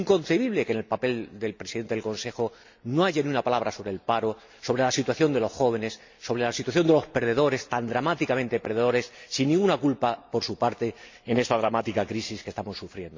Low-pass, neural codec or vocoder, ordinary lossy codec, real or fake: 7.2 kHz; none; MP3, 64 kbps; real